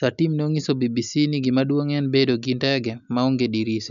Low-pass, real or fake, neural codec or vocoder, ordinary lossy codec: 7.2 kHz; real; none; none